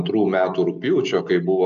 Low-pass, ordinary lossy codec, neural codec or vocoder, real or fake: 7.2 kHz; MP3, 64 kbps; none; real